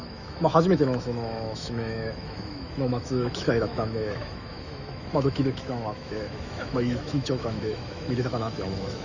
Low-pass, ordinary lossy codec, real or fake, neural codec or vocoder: 7.2 kHz; none; fake; autoencoder, 48 kHz, 128 numbers a frame, DAC-VAE, trained on Japanese speech